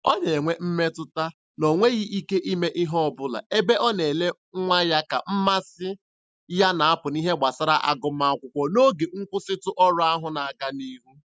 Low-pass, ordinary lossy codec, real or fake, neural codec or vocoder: none; none; real; none